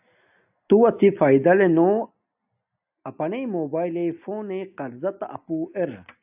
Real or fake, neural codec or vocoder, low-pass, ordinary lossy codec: real; none; 3.6 kHz; AAC, 32 kbps